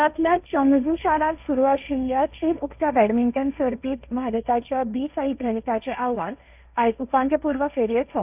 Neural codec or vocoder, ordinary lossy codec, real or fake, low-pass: codec, 16 kHz, 1.1 kbps, Voila-Tokenizer; none; fake; 3.6 kHz